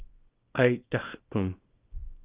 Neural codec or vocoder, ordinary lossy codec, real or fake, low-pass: codec, 24 kHz, 0.9 kbps, WavTokenizer, small release; Opus, 24 kbps; fake; 3.6 kHz